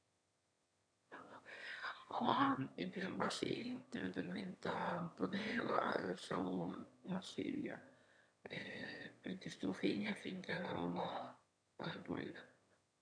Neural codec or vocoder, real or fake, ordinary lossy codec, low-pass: autoencoder, 22.05 kHz, a latent of 192 numbers a frame, VITS, trained on one speaker; fake; none; 9.9 kHz